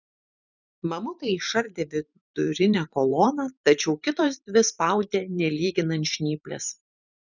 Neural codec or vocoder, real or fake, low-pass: vocoder, 44.1 kHz, 128 mel bands every 512 samples, BigVGAN v2; fake; 7.2 kHz